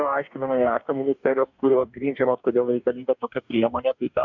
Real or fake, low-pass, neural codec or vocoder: fake; 7.2 kHz; codec, 44.1 kHz, 2.6 kbps, DAC